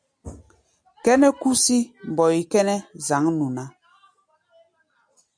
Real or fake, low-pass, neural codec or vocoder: real; 9.9 kHz; none